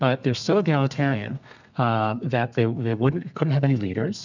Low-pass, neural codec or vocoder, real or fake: 7.2 kHz; codec, 32 kHz, 1.9 kbps, SNAC; fake